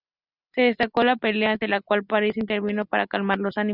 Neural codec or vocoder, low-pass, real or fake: none; 5.4 kHz; real